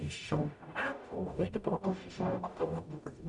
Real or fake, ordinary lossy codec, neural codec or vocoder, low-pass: fake; MP3, 96 kbps; codec, 44.1 kHz, 0.9 kbps, DAC; 10.8 kHz